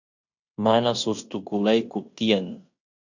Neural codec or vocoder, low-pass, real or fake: codec, 16 kHz in and 24 kHz out, 0.9 kbps, LongCat-Audio-Codec, fine tuned four codebook decoder; 7.2 kHz; fake